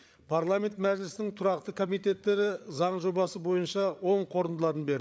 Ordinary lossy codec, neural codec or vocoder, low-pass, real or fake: none; codec, 16 kHz, 8 kbps, FreqCodec, larger model; none; fake